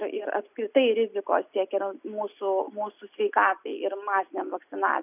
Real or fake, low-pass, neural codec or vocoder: real; 3.6 kHz; none